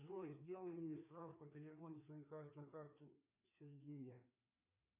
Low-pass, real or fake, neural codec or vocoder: 3.6 kHz; fake; codec, 16 kHz, 2 kbps, FreqCodec, larger model